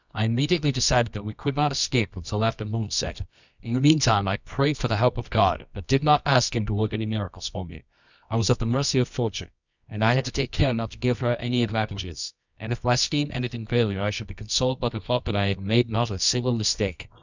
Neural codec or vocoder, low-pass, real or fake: codec, 24 kHz, 0.9 kbps, WavTokenizer, medium music audio release; 7.2 kHz; fake